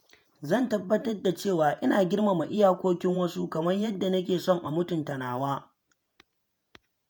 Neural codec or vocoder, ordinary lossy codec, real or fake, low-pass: vocoder, 48 kHz, 128 mel bands, Vocos; none; fake; none